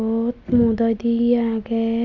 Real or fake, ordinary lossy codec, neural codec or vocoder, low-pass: real; none; none; 7.2 kHz